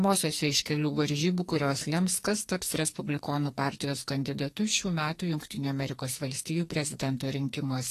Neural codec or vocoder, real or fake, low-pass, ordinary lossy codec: codec, 44.1 kHz, 2.6 kbps, SNAC; fake; 14.4 kHz; AAC, 48 kbps